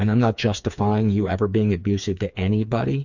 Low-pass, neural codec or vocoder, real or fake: 7.2 kHz; codec, 16 kHz, 4 kbps, FreqCodec, smaller model; fake